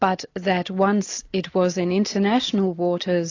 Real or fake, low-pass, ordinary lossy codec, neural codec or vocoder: real; 7.2 kHz; AAC, 48 kbps; none